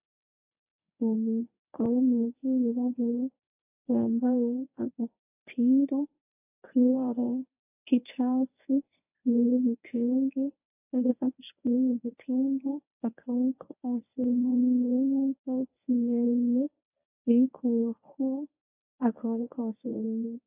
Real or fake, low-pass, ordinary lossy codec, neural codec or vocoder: fake; 3.6 kHz; AAC, 24 kbps; codec, 24 kHz, 0.9 kbps, WavTokenizer, medium speech release version 1